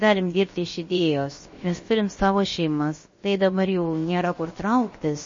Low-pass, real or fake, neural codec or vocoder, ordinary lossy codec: 7.2 kHz; fake; codec, 16 kHz, about 1 kbps, DyCAST, with the encoder's durations; MP3, 32 kbps